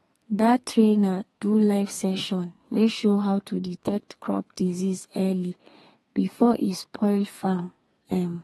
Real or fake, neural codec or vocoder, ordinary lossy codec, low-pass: fake; codec, 32 kHz, 1.9 kbps, SNAC; AAC, 32 kbps; 14.4 kHz